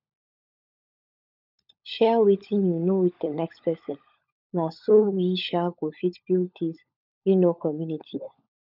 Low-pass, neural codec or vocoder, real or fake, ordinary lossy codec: 5.4 kHz; codec, 16 kHz, 16 kbps, FunCodec, trained on LibriTTS, 50 frames a second; fake; none